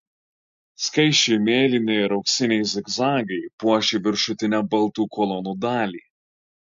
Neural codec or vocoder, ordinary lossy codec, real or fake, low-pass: none; MP3, 48 kbps; real; 7.2 kHz